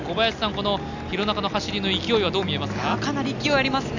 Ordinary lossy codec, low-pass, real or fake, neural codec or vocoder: none; 7.2 kHz; real; none